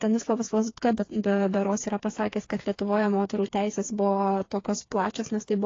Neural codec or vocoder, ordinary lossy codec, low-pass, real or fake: codec, 16 kHz, 4 kbps, FreqCodec, smaller model; AAC, 32 kbps; 7.2 kHz; fake